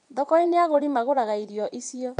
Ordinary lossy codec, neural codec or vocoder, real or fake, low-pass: none; vocoder, 22.05 kHz, 80 mel bands, Vocos; fake; 9.9 kHz